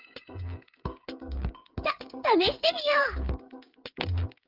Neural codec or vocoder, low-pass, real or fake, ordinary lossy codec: codec, 16 kHz, 8 kbps, FreqCodec, smaller model; 5.4 kHz; fake; Opus, 24 kbps